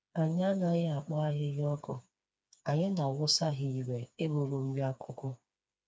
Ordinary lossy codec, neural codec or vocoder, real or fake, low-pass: none; codec, 16 kHz, 4 kbps, FreqCodec, smaller model; fake; none